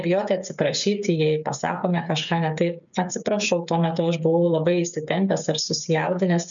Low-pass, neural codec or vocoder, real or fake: 7.2 kHz; codec, 16 kHz, 8 kbps, FreqCodec, smaller model; fake